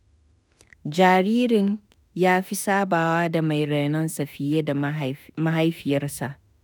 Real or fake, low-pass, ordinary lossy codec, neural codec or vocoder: fake; none; none; autoencoder, 48 kHz, 32 numbers a frame, DAC-VAE, trained on Japanese speech